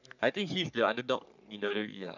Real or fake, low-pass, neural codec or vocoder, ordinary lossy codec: fake; 7.2 kHz; codec, 44.1 kHz, 3.4 kbps, Pupu-Codec; none